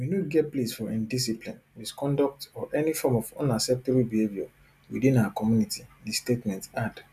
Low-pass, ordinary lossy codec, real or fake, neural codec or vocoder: 14.4 kHz; none; real; none